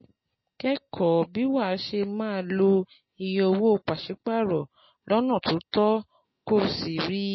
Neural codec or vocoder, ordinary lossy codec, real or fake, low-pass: none; MP3, 24 kbps; real; 7.2 kHz